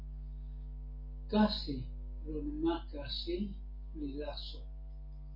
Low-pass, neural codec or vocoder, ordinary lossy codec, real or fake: 5.4 kHz; none; MP3, 32 kbps; real